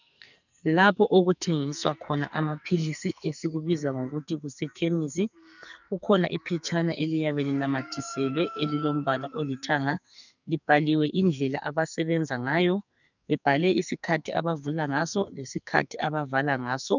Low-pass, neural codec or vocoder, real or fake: 7.2 kHz; codec, 44.1 kHz, 2.6 kbps, SNAC; fake